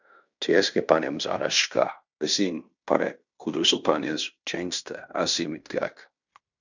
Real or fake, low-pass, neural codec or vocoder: fake; 7.2 kHz; codec, 16 kHz in and 24 kHz out, 0.9 kbps, LongCat-Audio-Codec, fine tuned four codebook decoder